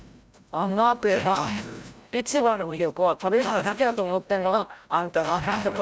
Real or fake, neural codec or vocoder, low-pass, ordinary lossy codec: fake; codec, 16 kHz, 0.5 kbps, FreqCodec, larger model; none; none